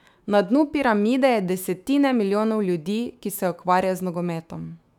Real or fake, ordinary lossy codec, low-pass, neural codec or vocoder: fake; none; 19.8 kHz; autoencoder, 48 kHz, 128 numbers a frame, DAC-VAE, trained on Japanese speech